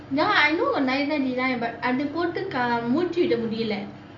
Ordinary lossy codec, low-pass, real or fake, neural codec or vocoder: none; 7.2 kHz; real; none